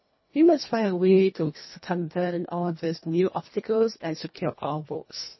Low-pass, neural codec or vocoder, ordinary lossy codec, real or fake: 7.2 kHz; codec, 24 kHz, 1.5 kbps, HILCodec; MP3, 24 kbps; fake